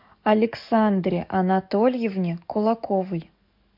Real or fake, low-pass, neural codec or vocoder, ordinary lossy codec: fake; 5.4 kHz; codec, 44.1 kHz, 7.8 kbps, DAC; MP3, 48 kbps